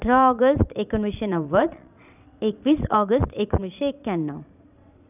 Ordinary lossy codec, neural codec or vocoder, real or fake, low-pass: none; vocoder, 22.05 kHz, 80 mel bands, Vocos; fake; 3.6 kHz